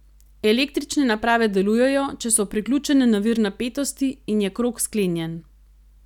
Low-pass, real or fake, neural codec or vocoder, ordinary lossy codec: 19.8 kHz; real; none; none